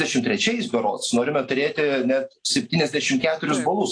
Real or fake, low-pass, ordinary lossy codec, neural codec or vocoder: real; 9.9 kHz; AAC, 48 kbps; none